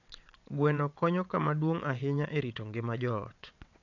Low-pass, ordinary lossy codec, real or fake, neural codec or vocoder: 7.2 kHz; none; fake; vocoder, 22.05 kHz, 80 mel bands, WaveNeXt